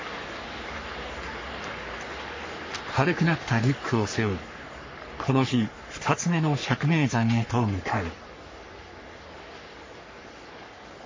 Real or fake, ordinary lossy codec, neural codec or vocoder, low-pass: fake; MP3, 32 kbps; codec, 44.1 kHz, 3.4 kbps, Pupu-Codec; 7.2 kHz